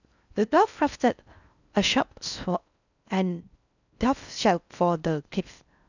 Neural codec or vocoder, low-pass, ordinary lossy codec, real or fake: codec, 16 kHz in and 24 kHz out, 0.6 kbps, FocalCodec, streaming, 4096 codes; 7.2 kHz; none; fake